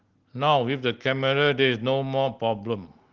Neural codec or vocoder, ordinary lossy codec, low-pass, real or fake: none; Opus, 16 kbps; 7.2 kHz; real